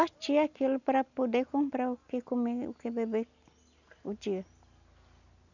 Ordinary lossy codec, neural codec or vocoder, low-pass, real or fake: none; none; 7.2 kHz; real